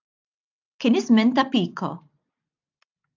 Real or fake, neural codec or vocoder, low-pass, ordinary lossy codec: real; none; 7.2 kHz; AAC, 48 kbps